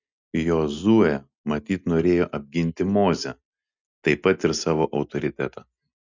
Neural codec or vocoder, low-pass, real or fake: none; 7.2 kHz; real